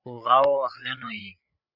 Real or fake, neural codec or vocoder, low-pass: fake; codec, 16 kHz, 8 kbps, FreqCodec, larger model; 5.4 kHz